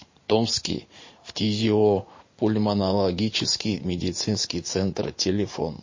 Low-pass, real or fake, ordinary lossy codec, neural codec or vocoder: 7.2 kHz; fake; MP3, 32 kbps; vocoder, 44.1 kHz, 80 mel bands, Vocos